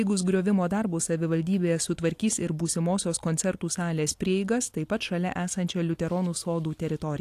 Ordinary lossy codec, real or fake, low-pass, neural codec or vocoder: AAC, 64 kbps; real; 14.4 kHz; none